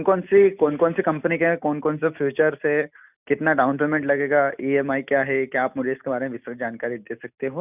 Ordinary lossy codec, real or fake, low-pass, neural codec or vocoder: none; real; 3.6 kHz; none